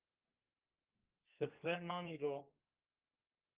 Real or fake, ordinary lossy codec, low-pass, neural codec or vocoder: fake; Opus, 24 kbps; 3.6 kHz; codec, 32 kHz, 1.9 kbps, SNAC